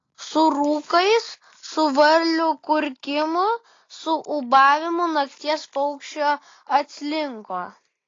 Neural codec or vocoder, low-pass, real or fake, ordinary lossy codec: none; 7.2 kHz; real; AAC, 32 kbps